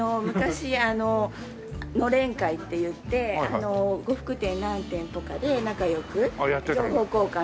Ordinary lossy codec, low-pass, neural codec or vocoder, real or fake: none; none; none; real